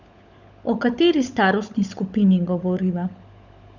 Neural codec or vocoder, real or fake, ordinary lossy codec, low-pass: codec, 16 kHz, 16 kbps, FunCodec, trained on LibriTTS, 50 frames a second; fake; none; 7.2 kHz